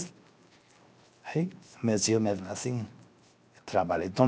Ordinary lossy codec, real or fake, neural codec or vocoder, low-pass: none; fake; codec, 16 kHz, 0.7 kbps, FocalCodec; none